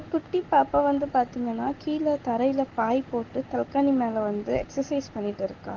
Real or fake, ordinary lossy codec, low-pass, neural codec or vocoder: real; Opus, 16 kbps; 7.2 kHz; none